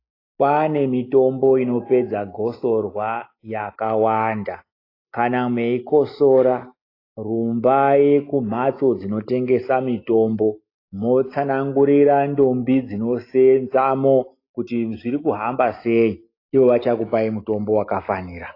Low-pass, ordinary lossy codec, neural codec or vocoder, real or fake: 5.4 kHz; AAC, 32 kbps; none; real